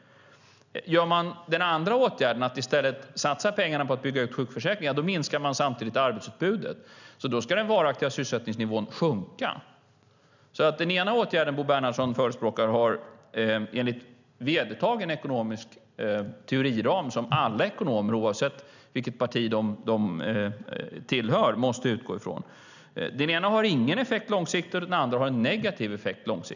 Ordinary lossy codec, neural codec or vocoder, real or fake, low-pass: none; none; real; 7.2 kHz